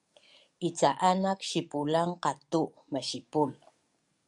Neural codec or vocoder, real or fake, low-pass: codec, 44.1 kHz, 7.8 kbps, DAC; fake; 10.8 kHz